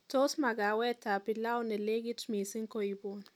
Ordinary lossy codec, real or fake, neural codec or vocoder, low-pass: none; real; none; 19.8 kHz